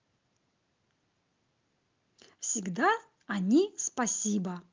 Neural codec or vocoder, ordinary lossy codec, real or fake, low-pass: none; Opus, 24 kbps; real; 7.2 kHz